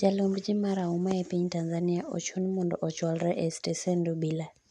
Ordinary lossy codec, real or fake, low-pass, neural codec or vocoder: none; real; none; none